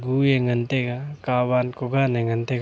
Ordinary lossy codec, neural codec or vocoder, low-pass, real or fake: none; none; none; real